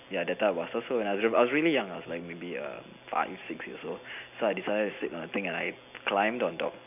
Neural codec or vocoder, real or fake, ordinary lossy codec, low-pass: none; real; none; 3.6 kHz